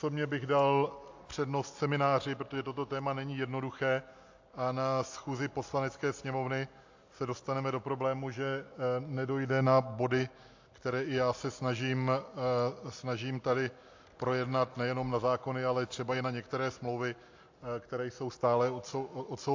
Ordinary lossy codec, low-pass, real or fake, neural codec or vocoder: AAC, 48 kbps; 7.2 kHz; real; none